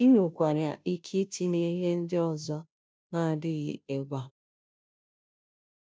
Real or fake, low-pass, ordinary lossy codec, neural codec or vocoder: fake; none; none; codec, 16 kHz, 0.5 kbps, FunCodec, trained on Chinese and English, 25 frames a second